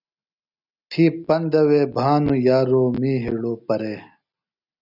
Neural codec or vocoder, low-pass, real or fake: none; 5.4 kHz; real